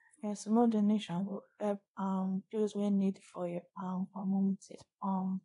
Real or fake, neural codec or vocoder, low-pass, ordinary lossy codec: fake; codec, 24 kHz, 0.9 kbps, WavTokenizer, small release; 10.8 kHz; AAC, 48 kbps